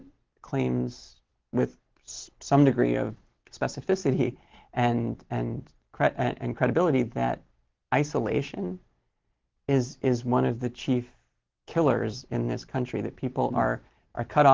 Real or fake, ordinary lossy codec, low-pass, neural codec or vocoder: real; Opus, 16 kbps; 7.2 kHz; none